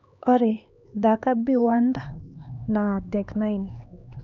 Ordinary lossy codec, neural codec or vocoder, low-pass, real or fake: none; codec, 16 kHz, 2 kbps, X-Codec, HuBERT features, trained on LibriSpeech; 7.2 kHz; fake